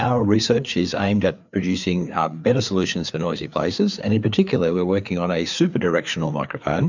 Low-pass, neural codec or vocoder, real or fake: 7.2 kHz; codec, 16 kHz, 4 kbps, FreqCodec, larger model; fake